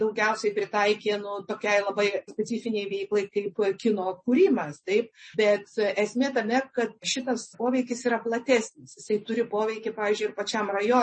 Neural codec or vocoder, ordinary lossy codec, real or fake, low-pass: none; MP3, 32 kbps; real; 9.9 kHz